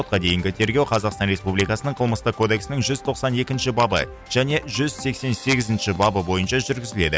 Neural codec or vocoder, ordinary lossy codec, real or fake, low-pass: none; none; real; none